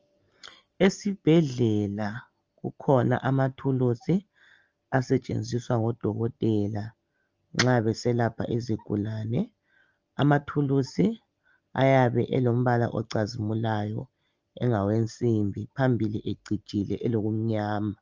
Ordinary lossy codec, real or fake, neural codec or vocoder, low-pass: Opus, 24 kbps; real; none; 7.2 kHz